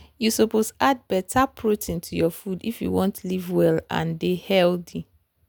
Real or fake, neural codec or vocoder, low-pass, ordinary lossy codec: real; none; none; none